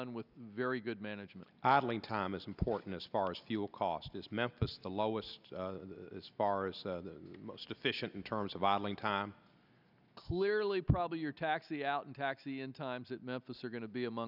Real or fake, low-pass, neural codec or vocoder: real; 5.4 kHz; none